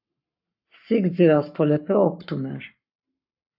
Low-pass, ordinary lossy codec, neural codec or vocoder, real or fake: 5.4 kHz; AAC, 48 kbps; codec, 44.1 kHz, 7.8 kbps, Pupu-Codec; fake